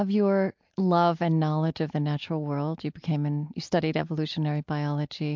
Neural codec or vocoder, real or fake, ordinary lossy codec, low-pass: none; real; MP3, 64 kbps; 7.2 kHz